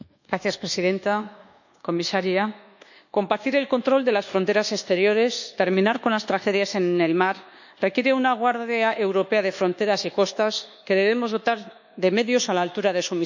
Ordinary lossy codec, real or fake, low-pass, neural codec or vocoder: none; fake; 7.2 kHz; codec, 24 kHz, 1.2 kbps, DualCodec